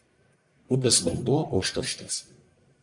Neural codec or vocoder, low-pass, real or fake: codec, 44.1 kHz, 1.7 kbps, Pupu-Codec; 10.8 kHz; fake